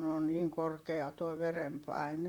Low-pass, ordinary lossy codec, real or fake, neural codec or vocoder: 19.8 kHz; Opus, 64 kbps; fake; vocoder, 44.1 kHz, 128 mel bands, Pupu-Vocoder